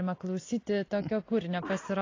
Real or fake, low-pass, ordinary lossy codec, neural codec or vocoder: real; 7.2 kHz; AAC, 32 kbps; none